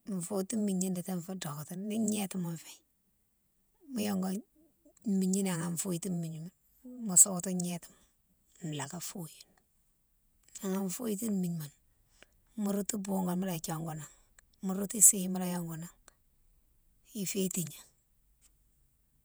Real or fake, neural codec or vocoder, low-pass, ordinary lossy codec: fake; vocoder, 48 kHz, 128 mel bands, Vocos; none; none